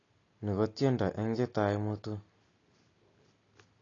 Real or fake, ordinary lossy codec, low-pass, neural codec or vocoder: real; AAC, 32 kbps; 7.2 kHz; none